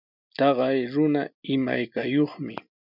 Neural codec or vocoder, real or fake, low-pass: none; real; 5.4 kHz